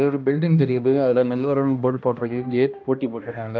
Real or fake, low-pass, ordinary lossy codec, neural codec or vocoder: fake; none; none; codec, 16 kHz, 1 kbps, X-Codec, HuBERT features, trained on balanced general audio